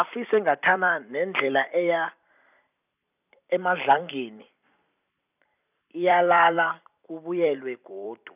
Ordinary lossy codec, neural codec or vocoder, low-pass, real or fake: none; vocoder, 44.1 kHz, 128 mel bands every 256 samples, BigVGAN v2; 3.6 kHz; fake